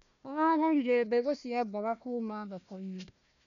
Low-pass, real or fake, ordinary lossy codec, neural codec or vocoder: 7.2 kHz; fake; none; codec, 16 kHz, 1 kbps, FunCodec, trained on Chinese and English, 50 frames a second